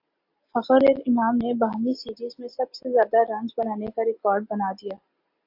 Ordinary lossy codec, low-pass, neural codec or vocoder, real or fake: AAC, 48 kbps; 5.4 kHz; none; real